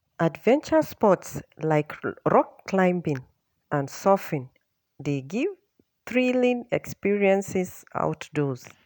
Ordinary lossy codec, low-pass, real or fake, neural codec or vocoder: none; none; real; none